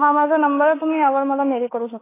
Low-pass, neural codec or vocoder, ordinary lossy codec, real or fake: 3.6 kHz; autoencoder, 48 kHz, 32 numbers a frame, DAC-VAE, trained on Japanese speech; AAC, 16 kbps; fake